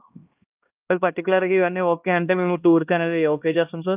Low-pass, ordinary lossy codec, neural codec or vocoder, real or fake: 3.6 kHz; Opus, 24 kbps; codec, 16 kHz, 1 kbps, X-Codec, HuBERT features, trained on LibriSpeech; fake